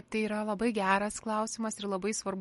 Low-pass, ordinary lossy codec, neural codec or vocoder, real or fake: 19.8 kHz; MP3, 48 kbps; none; real